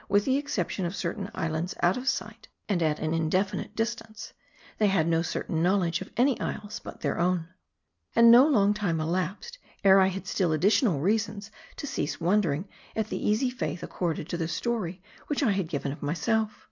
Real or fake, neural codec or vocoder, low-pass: real; none; 7.2 kHz